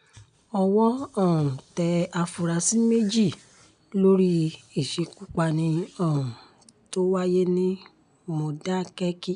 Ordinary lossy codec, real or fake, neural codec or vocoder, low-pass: none; real; none; 9.9 kHz